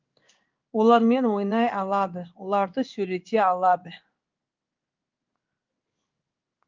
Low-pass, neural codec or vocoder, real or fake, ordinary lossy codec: 7.2 kHz; codec, 16 kHz in and 24 kHz out, 1 kbps, XY-Tokenizer; fake; Opus, 24 kbps